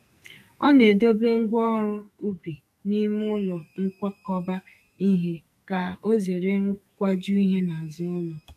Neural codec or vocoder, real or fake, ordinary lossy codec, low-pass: codec, 44.1 kHz, 2.6 kbps, SNAC; fake; none; 14.4 kHz